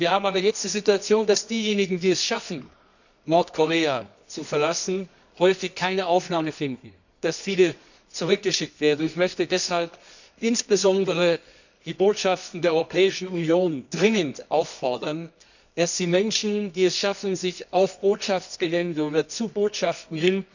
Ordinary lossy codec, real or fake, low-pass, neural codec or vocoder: none; fake; 7.2 kHz; codec, 24 kHz, 0.9 kbps, WavTokenizer, medium music audio release